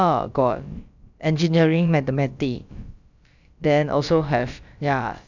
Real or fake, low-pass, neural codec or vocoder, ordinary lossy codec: fake; 7.2 kHz; codec, 16 kHz, about 1 kbps, DyCAST, with the encoder's durations; none